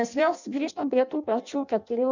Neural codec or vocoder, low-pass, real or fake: codec, 16 kHz in and 24 kHz out, 0.6 kbps, FireRedTTS-2 codec; 7.2 kHz; fake